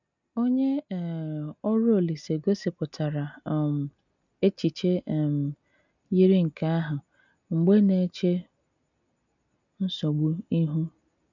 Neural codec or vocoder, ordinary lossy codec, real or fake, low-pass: none; none; real; 7.2 kHz